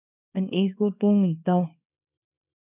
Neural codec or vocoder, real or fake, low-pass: codec, 24 kHz, 0.9 kbps, WavTokenizer, small release; fake; 3.6 kHz